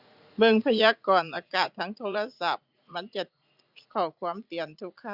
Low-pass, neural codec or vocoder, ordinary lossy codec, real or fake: 5.4 kHz; vocoder, 44.1 kHz, 80 mel bands, Vocos; Opus, 64 kbps; fake